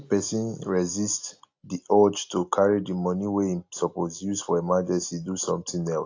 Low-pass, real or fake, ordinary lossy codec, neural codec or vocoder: 7.2 kHz; real; AAC, 48 kbps; none